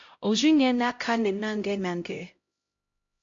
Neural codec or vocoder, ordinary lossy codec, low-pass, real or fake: codec, 16 kHz, 0.5 kbps, X-Codec, HuBERT features, trained on LibriSpeech; AAC, 48 kbps; 7.2 kHz; fake